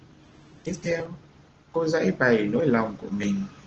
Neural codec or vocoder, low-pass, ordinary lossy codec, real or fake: none; 7.2 kHz; Opus, 16 kbps; real